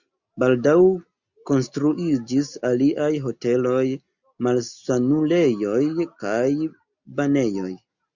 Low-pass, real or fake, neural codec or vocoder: 7.2 kHz; real; none